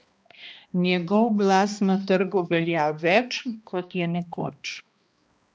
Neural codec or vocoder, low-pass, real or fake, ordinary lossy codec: codec, 16 kHz, 1 kbps, X-Codec, HuBERT features, trained on balanced general audio; none; fake; none